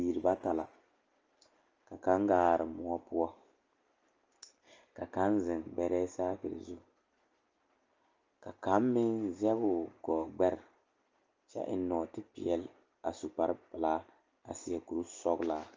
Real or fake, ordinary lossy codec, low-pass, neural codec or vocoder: real; Opus, 24 kbps; 7.2 kHz; none